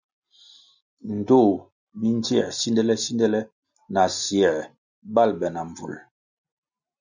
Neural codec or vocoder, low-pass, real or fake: none; 7.2 kHz; real